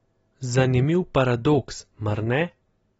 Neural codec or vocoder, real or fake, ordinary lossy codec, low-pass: none; real; AAC, 24 kbps; 19.8 kHz